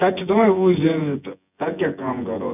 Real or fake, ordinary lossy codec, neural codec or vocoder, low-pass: fake; none; vocoder, 24 kHz, 100 mel bands, Vocos; 3.6 kHz